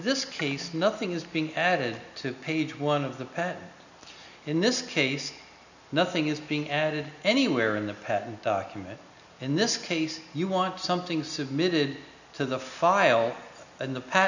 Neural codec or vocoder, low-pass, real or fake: none; 7.2 kHz; real